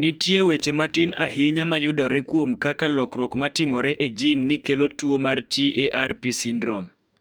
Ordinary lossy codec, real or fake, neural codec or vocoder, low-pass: none; fake; codec, 44.1 kHz, 2.6 kbps, DAC; none